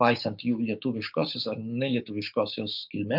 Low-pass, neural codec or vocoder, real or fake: 5.4 kHz; codec, 16 kHz, 6 kbps, DAC; fake